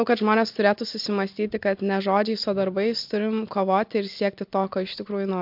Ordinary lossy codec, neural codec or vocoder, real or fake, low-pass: MP3, 48 kbps; none; real; 5.4 kHz